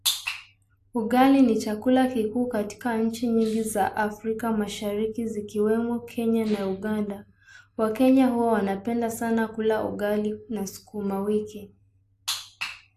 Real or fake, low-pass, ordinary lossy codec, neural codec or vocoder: real; 14.4 kHz; AAC, 64 kbps; none